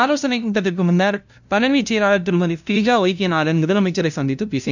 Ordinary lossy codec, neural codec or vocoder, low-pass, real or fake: none; codec, 16 kHz, 0.5 kbps, FunCodec, trained on LibriTTS, 25 frames a second; 7.2 kHz; fake